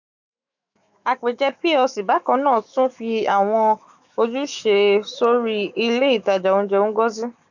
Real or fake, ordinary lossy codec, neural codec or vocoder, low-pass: fake; none; autoencoder, 48 kHz, 128 numbers a frame, DAC-VAE, trained on Japanese speech; 7.2 kHz